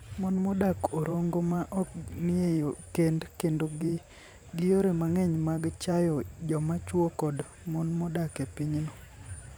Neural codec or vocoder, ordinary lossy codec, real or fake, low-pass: vocoder, 44.1 kHz, 128 mel bands every 512 samples, BigVGAN v2; none; fake; none